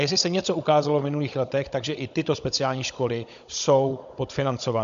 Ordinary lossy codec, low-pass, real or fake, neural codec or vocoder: MP3, 64 kbps; 7.2 kHz; fake; codec, 16 kHz, 16 kbps, FunCodec, trained on Chinese and English, 50 frames a second